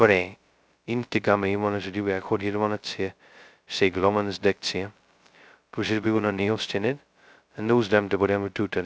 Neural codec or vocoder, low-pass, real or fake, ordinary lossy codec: codec, 16 kHz, 0.2 kbps, FocalCodec; none; fake; none